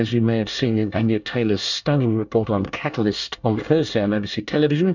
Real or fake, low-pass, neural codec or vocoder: fake; 7.2 kHz; codec, 24 kHz, 1 kbps, SNAC